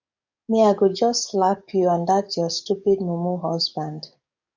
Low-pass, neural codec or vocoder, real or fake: 7.2 kHz; codec, 44.1 kHz, 7.8 kbps, DAC; fake